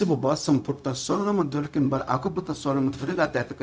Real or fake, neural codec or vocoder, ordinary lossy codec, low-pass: fake; codec, 16 kHz, 0.4 kbps, LongCat-Audio-Codec; none; none